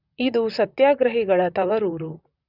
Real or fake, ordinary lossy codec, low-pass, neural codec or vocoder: fake; none; 5.4 kHz; vocoder, 44.1 kHz, 128 mel bands, Pupu-Vocoder